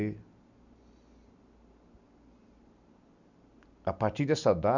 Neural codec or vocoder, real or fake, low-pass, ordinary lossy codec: none; real; 7.2 kHz; none